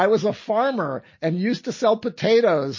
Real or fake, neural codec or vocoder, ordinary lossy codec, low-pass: real; none; MP3, 32 kbps; 7.2 kHz